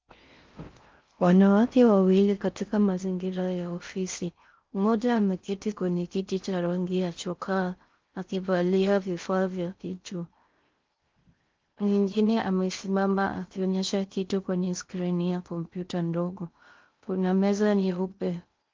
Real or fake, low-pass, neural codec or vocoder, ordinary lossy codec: fake; 7.2 kHz; codec, 16 kHz in and 24 kHz out, 0.6 kbps, FocalCodec, streaming, 4096 codes; Opus, 32 kbps